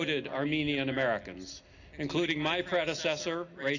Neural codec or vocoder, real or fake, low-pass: none; real; 7.2 kHz